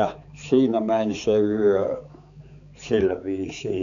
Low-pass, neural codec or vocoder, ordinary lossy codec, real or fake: 7.2 kHz; codec, 16 kHz, 4 kbps, X-Codec, HuBERT features, trained on balanced general audio; none; fake